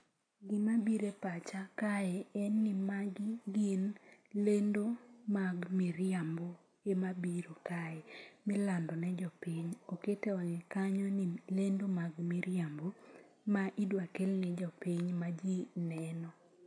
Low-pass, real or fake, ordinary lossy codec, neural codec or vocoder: 9.9 kHz; real; AAC, 64 kbps; none